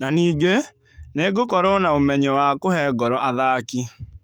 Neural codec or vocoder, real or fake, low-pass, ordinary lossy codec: codec, 44.1 kHz, 7.8 kbps, DAC; fake; none; none